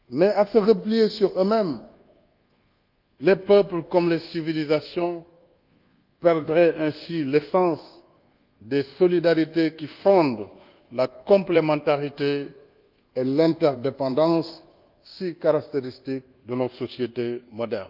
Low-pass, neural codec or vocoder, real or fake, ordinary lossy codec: 5.4 kHz; codec, 24 kHz, 1.2 kbps, DualCodec; fake; Opus, 32 kbps